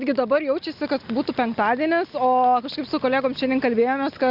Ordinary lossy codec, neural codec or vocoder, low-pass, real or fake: Opus, 64 kbps; none; 5.4 kHz; real